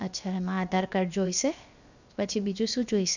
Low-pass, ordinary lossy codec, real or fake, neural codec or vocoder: 7.2 kHz; none; fake; codec, 16 kHz, 0.7 kbps, FocalCodec